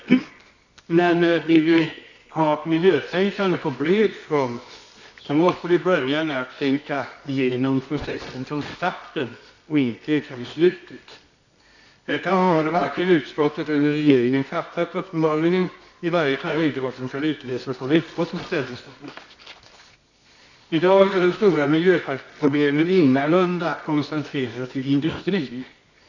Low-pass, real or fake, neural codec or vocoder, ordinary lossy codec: 7.2 kHz; fake; codec, 24 kHz, 0.9 kbps, WavTokenizer, medium music audio release; none